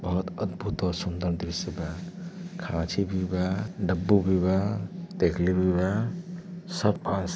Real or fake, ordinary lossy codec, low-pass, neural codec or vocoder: real; none; none; none